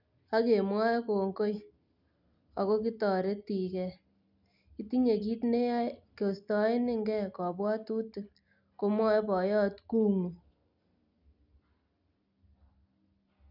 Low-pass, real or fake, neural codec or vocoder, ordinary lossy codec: 5.4 kHz; real; none; none